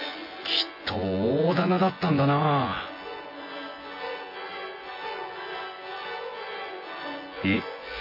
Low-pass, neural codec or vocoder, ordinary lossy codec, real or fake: 5.4 kHz; vocoder, 24 kHz, 100 mel bands, Vocos; MP3, 32 kbps; fake